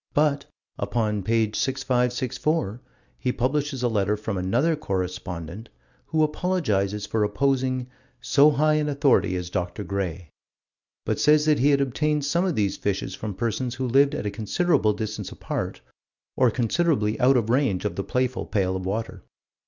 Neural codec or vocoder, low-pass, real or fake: none; 7.2 kHz; real